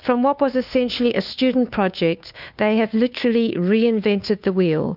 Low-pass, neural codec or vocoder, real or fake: 5.4 kHz; codec, 16 kHz, 2 kbps, FunCodec, trained on Chinese and English, 25 frames a second; fake